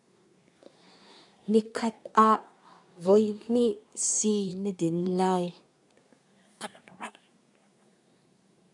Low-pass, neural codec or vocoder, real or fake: 10.8 kHz; codec, 24 kHz, 1 kbps, SNAC; fake